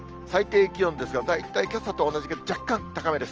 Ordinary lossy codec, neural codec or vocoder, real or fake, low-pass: Opus, 24 kbps; none; real; 7.2 kHz